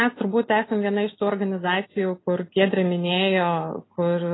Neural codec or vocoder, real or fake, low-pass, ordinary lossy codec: none; real; 7.2 kHz; AAC, 16 kbps